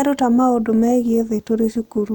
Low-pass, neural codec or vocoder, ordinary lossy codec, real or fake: 19.8 kHz; none; none; real